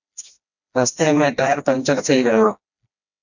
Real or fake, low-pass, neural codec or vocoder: fake; 7.2 kHz; codec, 16 kHz, 1 kbps, FreqCodec, smaller model